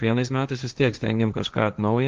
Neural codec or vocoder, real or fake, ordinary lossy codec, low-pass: codec, 16 kHz, 1.1 kbps, Voila-Tokenizer; fake; Opus, 32 kbps; 7.2 kHz